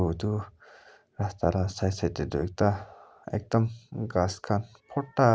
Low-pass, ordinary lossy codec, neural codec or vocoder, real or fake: none; none; none; real